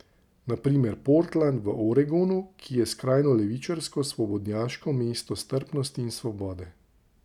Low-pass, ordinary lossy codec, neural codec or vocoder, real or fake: 19.8 kHz; none; none; real